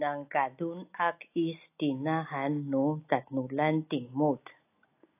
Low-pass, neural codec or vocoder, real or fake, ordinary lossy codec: 3.6 kHz; none; real; AAC, 24 kbps